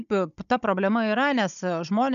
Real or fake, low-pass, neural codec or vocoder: fake; 7.2 kHz; codec, 16 kHz, 16 kbps, FunCodec, trained on Chinese and English, 50 frames a second